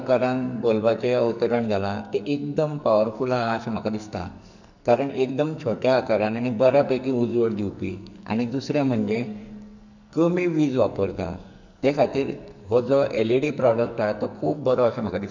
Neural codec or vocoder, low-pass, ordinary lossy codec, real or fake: codec, 44.1 kHz, 2.6 kbps, SNAC; 7.2 kHz; none; fake